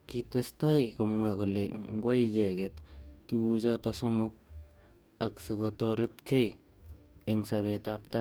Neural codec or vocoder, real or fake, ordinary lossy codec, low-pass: codec, 44.1 kHz, 2.6 kbps, DAC; fake; none; none